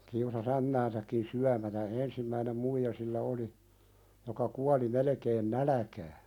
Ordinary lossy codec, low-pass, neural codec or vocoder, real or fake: none; 19.8 kHz; none; real